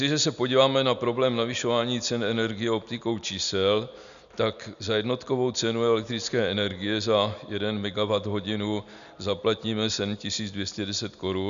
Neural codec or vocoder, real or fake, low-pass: none; real; 7.2 kHz